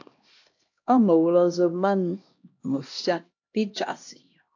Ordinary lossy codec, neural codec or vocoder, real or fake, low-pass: AAC, 48 kbps; codec, 16 kHz, 1 kbps, X-Codec, HuBERT features, trained on LibriSpeech; fake; 7.2 kHz